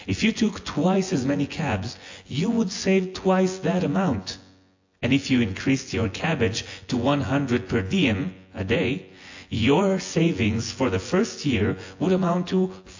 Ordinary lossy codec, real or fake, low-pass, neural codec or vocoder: AAC, 48 kbps; fake; 7.2 kHz; vocoder, 24 kHz, 100 mel bands, Vocos